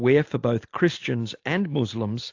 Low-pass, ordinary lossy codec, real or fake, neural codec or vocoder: 7.2 kHz; AAC, 48 kbps; real; none